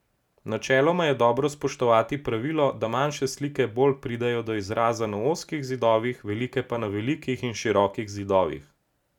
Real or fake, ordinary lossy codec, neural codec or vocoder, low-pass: real; none; none; 19.8 kHz